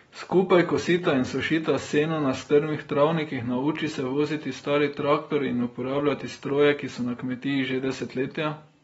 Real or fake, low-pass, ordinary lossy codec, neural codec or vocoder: real; 19.8 kHz; AAC, 24 kbps; none